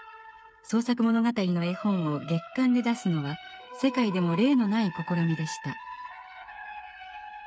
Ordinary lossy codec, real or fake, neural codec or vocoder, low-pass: none; fake; codec, 16 kHz, 8 kbps, FreqCodec, smaller model; none